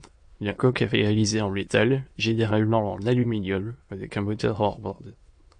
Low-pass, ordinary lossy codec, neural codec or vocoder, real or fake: 9.9 kHz; MP3, 48 kbps; autoencoder, 22.05 kHz, a latent of 192 numbers a frame, VITS, trained on many speakers; fake